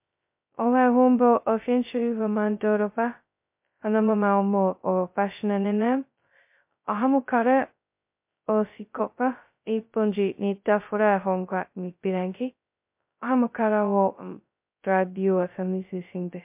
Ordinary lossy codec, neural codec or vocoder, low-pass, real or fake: MP3, 32 kbps; codec, 16 kHz, 0.2 kbps, FocalCodec; 3.6 kHz; fake